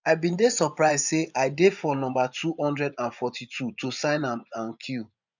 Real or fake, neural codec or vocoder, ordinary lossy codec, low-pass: fake; vocoder, 24 kHz, 100 mel bands, Vocos; none; 7.2 kHz